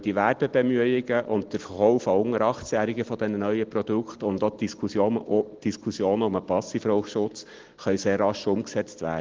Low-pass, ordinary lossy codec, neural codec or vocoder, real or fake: 7.2 kHz; Opus, 16 kbps; none; real